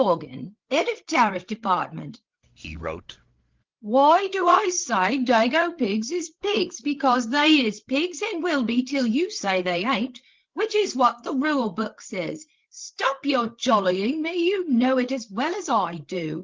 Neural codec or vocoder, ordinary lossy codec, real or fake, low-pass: codec, 16 kHz, 8 kbps, FreqCodec, larger model; Opus, 16 kbps; fake; 7.2 kHz